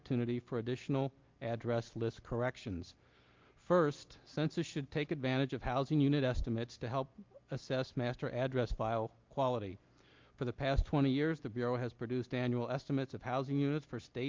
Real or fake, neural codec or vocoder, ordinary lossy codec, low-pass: real; none; Opus, 24 kbps; 7.2 kHz